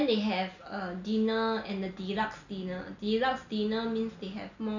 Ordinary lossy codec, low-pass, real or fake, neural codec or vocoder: none; 7.2 kHz; real; none